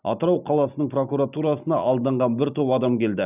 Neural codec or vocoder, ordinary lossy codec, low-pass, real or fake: none; none; 3.6 kHz; real